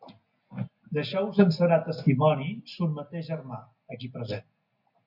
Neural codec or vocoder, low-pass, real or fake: none; 5.4 kHz; real